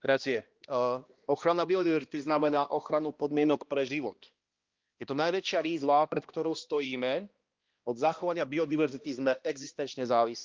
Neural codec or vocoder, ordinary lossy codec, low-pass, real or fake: codec, 16 kHz, 1 kbps, X-Codec, HuBERT features, trained on balanced general audio; Opus, 32 kbps; 7.2 kHz; fake